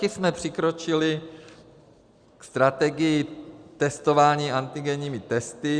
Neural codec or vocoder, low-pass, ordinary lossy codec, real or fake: none; 9.9 kHz; Opus, 64 kbps; real